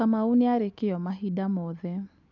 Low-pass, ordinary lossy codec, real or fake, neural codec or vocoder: 7.2 kHz; none; real; none